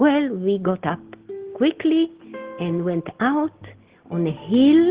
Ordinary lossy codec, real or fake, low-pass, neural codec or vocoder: Opus, 16 kbps; real; 3.6 kHz; none